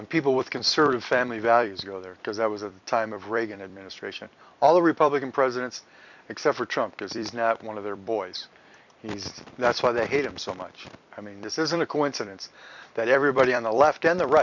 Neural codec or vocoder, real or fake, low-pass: vocoder, 44.1 kHz, 128 mel bands every 256 samples, BigVGAN v2; fake; 7.2 kHz